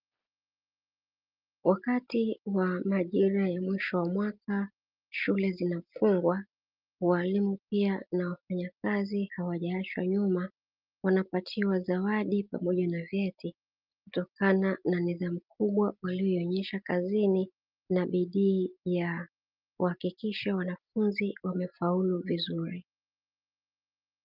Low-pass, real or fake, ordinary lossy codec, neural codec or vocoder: 5.4 kHz; real; Opus, 24 kbps; none